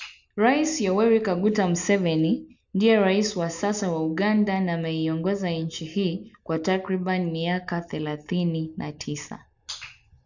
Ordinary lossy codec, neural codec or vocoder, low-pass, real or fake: none; none; 7.2 kHz; real